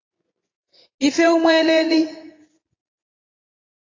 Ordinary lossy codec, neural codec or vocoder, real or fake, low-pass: AAC, 32 kbps; vocoder, 24 kHz, 100 mel bands, Vocos; fake; 7.2 kHz